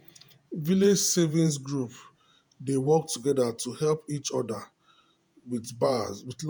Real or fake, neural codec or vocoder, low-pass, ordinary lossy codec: fake; vocoder, 48 kHz, 128 mel bands, Vocos; none; none